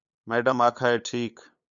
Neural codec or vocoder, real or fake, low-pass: codec, 16 kHz, 8 kbps, FunCodec, trained on LibriTTS, 25 frames a second; fake; 7.2 kHz